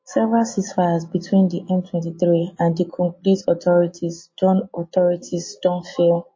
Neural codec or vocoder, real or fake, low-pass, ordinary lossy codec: none; real; 7.2 kHz; MP3, 32 kbps